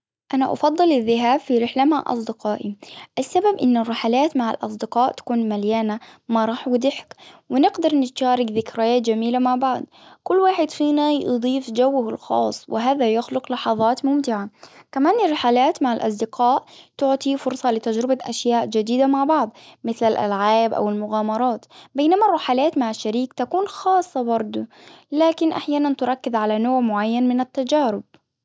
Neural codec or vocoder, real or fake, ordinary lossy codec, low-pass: none; real; none; none